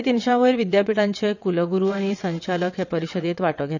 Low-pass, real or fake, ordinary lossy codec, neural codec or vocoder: 7.2 kHz; fake; none; vocoder, 22.05 kHz, 80 mel bands, WaveNeXt